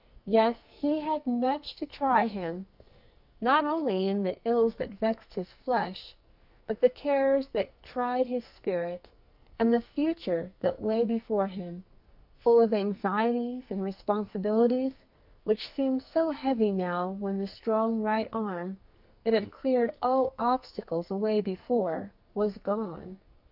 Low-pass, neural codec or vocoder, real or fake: 5.4 kHz; codec, 44.1 kHz, 2.6 kbps, SNAC; fake